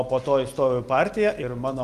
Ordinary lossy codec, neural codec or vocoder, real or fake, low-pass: Opus, 32 kbps; none; real; 14.4 kHz